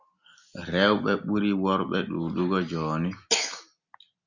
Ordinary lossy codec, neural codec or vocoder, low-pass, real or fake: Opus, 64 kbps; none; 7.2 kHz; real